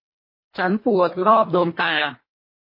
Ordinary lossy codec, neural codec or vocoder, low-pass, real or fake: MP3, 24 kbps; codec, 24 kHz, 1.5 kbps, HILCodec; 5.4 kHz; fake